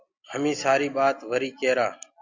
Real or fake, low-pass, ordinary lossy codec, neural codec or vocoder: real; 7.2 kHz; Opus, 64 kbps; none